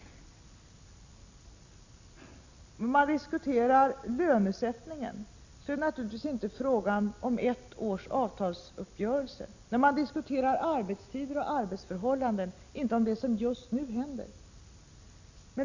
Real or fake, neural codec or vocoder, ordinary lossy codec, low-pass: real; none; Opus, 64 kbps; 7.2 kHz